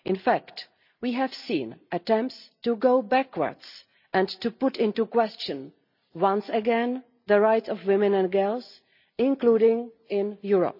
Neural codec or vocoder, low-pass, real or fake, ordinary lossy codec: none; 5.4 kHz; real; none